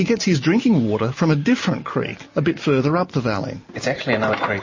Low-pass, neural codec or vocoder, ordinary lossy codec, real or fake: 7.2 kHz; none; MP3, 32 kbps; real